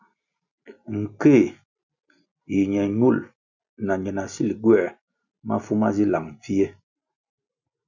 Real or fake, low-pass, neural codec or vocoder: real; 7.2 kHz; none